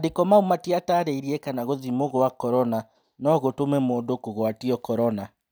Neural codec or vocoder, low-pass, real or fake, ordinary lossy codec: none; none; real; none